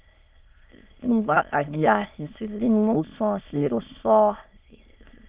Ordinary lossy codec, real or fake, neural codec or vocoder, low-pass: Opus, 32 kbps; fake; autoencoder, 22.05 kHz, a latent of 192 numbers a frame, VITS, trained on many speakers; 3.6 kHz